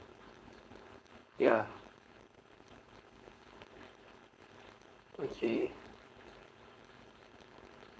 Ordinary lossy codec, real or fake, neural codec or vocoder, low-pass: none; fake; codec, 16 kHz, 4.8 kbps, FACodec; none